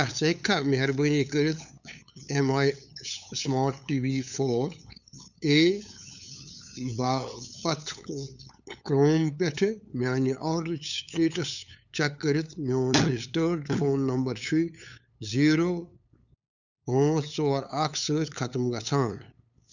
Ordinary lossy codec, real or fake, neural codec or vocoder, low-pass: none; fake; codec, 16 kHz, 8 kbps, FunCodec, trained on LibriTTS, 25 frames a second; 7.2 kHz